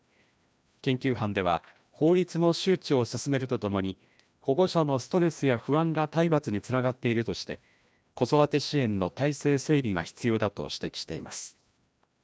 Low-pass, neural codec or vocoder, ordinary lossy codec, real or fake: none; codec, 16 kHz, 1 kbps, FreqCodec, larger model; none; fake